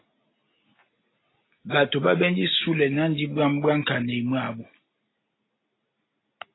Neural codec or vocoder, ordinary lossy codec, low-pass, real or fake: none; AAC, 16 kbps; 7.2 kHz; real